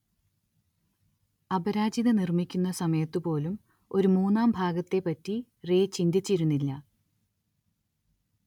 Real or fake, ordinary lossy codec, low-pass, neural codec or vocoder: real; none; 19.8 kHz; none